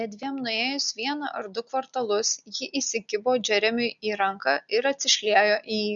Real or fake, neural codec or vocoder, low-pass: real; none; 7.2 kHz